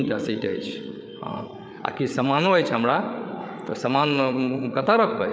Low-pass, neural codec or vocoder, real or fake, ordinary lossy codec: none; codec, 16 kHz, 8 kbps, FreqCodec, larger model; fake; none